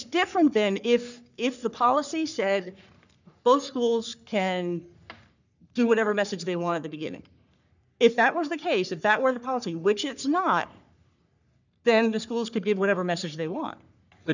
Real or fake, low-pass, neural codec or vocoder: fake; 7.2 kHz; codec, 44.1 kHz, 3.4 kbps, Pupu-Codec